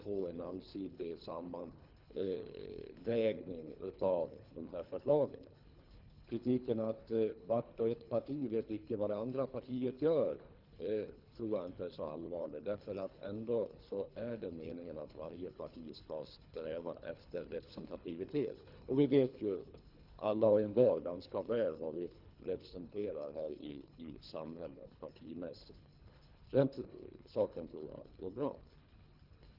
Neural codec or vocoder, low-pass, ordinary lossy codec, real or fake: codec, 24 kHz, 3 kbps, HILCodec; 5.4 kHz; Opus, 32 kbps; fake